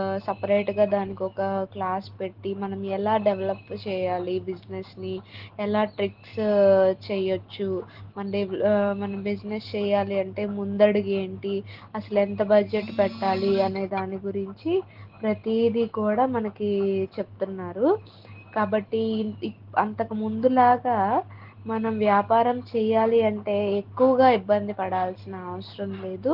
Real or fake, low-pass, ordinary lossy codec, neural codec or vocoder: real; 5.4 kHz; Opus, 16 kbps; none